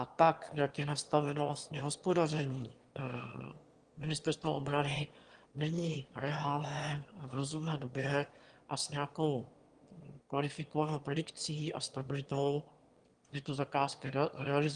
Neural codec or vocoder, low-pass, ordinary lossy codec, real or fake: autoencoder, 22.05 kHz, a latent of 192 numbers a frame, VITS, trained on one speaker; 9.9 kHz; Opus, 24 kbps; fake